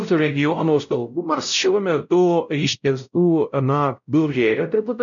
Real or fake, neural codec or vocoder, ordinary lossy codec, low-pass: fake; codec, 16 kHz, 0.5 kbps, X-Codec, WavLM features, trained on Multilingual LibriSpeech; MP3, 96 kbps; 7.2 kHz